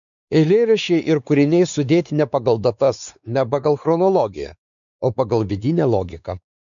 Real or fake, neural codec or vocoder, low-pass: fake; codec, 16 kHz, 4 kbps, X-Codec, WavLM features, trained on Multilingual LibriSpeech; 7.2 kHz